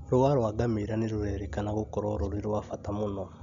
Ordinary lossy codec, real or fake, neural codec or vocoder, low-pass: none; real; none; 7.2 kHz